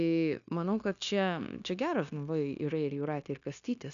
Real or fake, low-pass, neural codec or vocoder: fake; 7.2 kHz; codec, 16 kHz, 0.9 kbps, LongCat-Audio-Codec